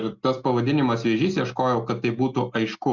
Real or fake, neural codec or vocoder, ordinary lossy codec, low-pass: real; none; Opus, 64 kbps; 7.2 kHz